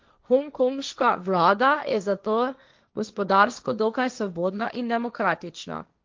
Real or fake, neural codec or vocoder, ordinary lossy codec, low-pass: fake; codec, 16 kHz, 1.1 kbps, Voila-Tokenizer; Opus, 32 kbps; 7.2 kHz